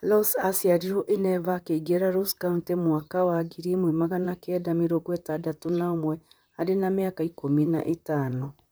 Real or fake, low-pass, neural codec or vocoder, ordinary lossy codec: fake; none; vocoder, 44.1 kHz, 128 mel bands, Pupu-Vocoder; none